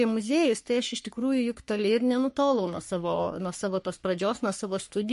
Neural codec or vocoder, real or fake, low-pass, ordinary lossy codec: codec, 44.1 kHz, 3.4 kbps, Pupu-Codec; fake; 14.4 kHz; MP3, 48 kbps